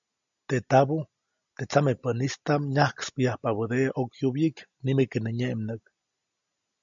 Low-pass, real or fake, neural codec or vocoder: 7.2 kHz; real; none